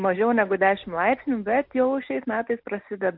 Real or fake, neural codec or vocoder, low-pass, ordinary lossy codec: real; none; 5.4 kHz; MP3, 48 kbps